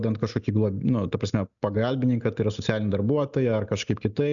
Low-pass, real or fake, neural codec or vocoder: 7.2 kHz; real; none